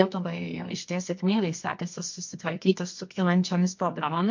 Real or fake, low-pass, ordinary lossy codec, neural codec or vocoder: fake; 7.2 kHz; MP3, 48 kbps; codec, 24 kHz, 0.9 kbps, WavTokenizer, medium music audio release